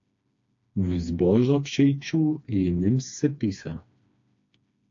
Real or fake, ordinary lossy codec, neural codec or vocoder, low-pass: fake; AAC, 48 kbps; codec, 16 kHz, 2 kbps, FreqCodec, smaller model; 7.2 kHz